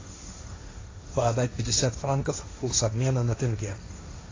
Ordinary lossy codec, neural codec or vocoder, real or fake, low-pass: AAC, 32 kbps; codec, 16 kHz, 1.1 kbps, Voila-Tokenizer; fake; 7.2 kHz